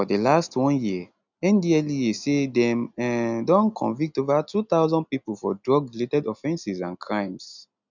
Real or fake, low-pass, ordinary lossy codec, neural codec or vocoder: real; 7.2 kHz; none; none